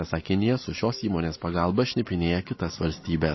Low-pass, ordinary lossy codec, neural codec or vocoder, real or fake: 7.2 kHz; MP3, 24 kbps; none; real